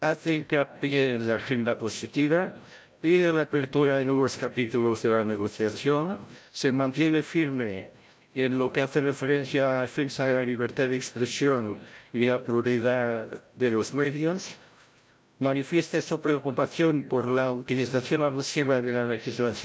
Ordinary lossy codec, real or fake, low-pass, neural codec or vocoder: none; fake; none; codec, 16 kHz, 0.5 kbps, FreqCodec, larger model